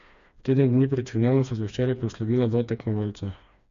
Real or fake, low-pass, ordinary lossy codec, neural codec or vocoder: fake; 7.2 kHz; MP3, 64 kbps; codec, 16 kHz, 2 kbps, FreqCodec, smaller model